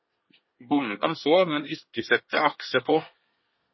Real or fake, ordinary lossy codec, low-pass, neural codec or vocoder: fake; MP3, 24 kbps; 7.2 kHz; codec, 24 kHz, 1 kbps, SNAC